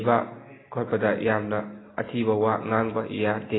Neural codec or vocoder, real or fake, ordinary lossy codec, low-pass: none; real; AAC, 16 kbps; 7.2 kHz